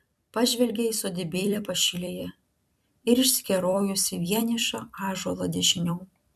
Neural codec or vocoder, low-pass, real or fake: vocoder, 44.1 kHz, 128 mel bands every 256 samples, BigVGAN v2; 14.4 kHz; fake